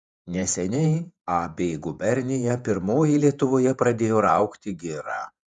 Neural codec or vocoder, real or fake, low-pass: vocoder, 48 kHz, 128 mel bands, Vocos; fake; 10.8 kHz